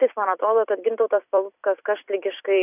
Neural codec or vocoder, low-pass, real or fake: vocoder, 44.1 kHz, 128 mel bands every 256 samples, BigVGAN v2; 3.6 kHz; fake